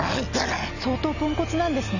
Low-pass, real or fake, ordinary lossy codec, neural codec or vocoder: 7.2 kHz; real; none; none